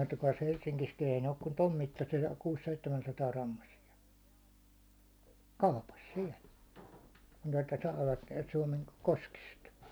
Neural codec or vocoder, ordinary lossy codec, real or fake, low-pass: none; none; real; none